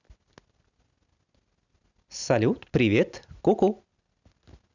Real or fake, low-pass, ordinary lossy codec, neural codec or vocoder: real; 7.2 kHz; none; none